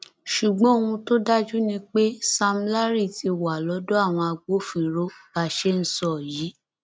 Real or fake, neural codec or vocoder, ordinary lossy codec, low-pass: real; none; none; none